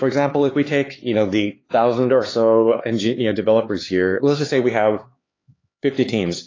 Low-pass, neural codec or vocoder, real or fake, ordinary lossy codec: 7.2 kHz; codec, 16 kHz, 4 kbps, X-Codec, HuBERT features, trained on LibriSpeech; fake; AAC, 32 kbps